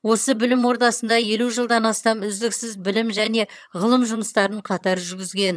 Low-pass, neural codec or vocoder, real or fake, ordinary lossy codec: none; vocoder, 22.05 kHz, 80 mel bands, HiFi-GAN; fake; none